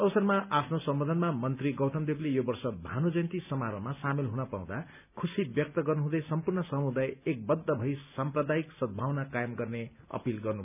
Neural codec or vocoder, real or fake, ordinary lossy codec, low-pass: none; real; none; 3.6 kHz